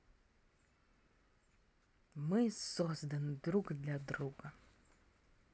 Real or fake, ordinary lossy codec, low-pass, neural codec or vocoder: real; none; none; none